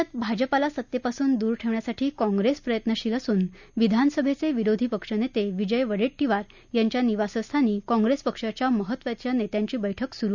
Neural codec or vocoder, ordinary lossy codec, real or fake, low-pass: none; none; real; 7.2 kHz